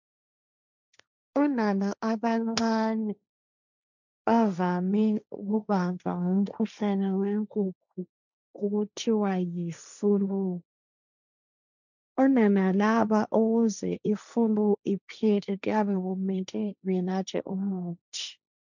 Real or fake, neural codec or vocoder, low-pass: fake; codec, 16 kHz, 1.1 kbps, Voila-Tokenizer; 7.2 kHz